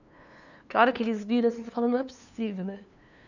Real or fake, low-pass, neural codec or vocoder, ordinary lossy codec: fake; 7.2 kHz; codec, 16 kHz, 2 kbps, FunCodec, trained on LibriTTS, 25 frames a second; none